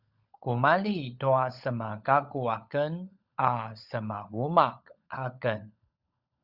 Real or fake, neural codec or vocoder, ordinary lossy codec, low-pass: fake; codec, 16 kHz, 16 kbps, FunCodec, trained on LibriTTS, 50 frames a second; Opus, 64 kbps; 5.4 kHz